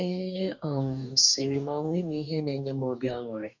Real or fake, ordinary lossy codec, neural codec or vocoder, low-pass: fake; none; codec, 44.1 kHz, 2.6 kbps, DAC; 7.2 kHz